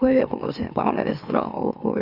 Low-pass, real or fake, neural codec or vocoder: 5.4 kHz; fake; autoencoder, 44.1 kHz, a latent of 192 numbers a frame, MeloTTS